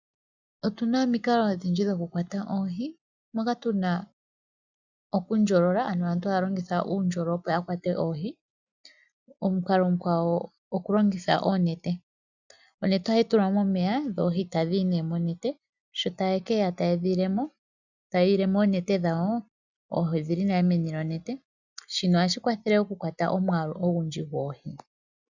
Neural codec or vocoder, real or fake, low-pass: none; real; 7.2 kHz